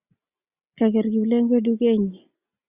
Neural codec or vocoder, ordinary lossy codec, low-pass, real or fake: none; Opus, 64 kbps; 3.6 kHz; real